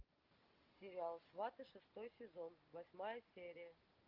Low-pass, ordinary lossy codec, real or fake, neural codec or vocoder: 5.4 kHz; Opus, 24 kbps; real; none